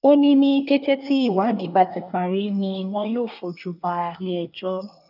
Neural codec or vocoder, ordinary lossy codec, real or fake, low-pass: codec, 24 kHz, 1 kbps, SNAC; none; fake; 5.4 kHz